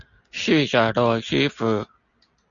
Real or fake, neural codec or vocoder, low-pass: real; none; 7.2 kHz